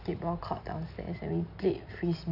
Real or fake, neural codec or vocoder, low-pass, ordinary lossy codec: real; none; 5.4 kHz; AAC, 32 kbps